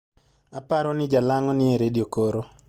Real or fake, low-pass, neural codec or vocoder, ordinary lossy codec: real; 19.8 kHz; none; Opus, 24 kbps